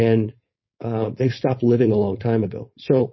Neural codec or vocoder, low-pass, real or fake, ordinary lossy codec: codec, 16 kHz, 4.8 kbps, FACodec; 7.2 kHz; fake; MP3, 24 kbps